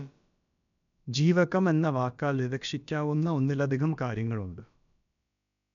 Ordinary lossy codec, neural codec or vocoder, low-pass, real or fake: none; codec, 16 kHz, about 1 kbps, DyCAST, with the encoder's durations; 7.2 kHz; fake